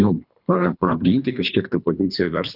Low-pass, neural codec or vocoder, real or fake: 5.4 kHz; codec, 24 kHz, 3 kbps, HILCodec; fake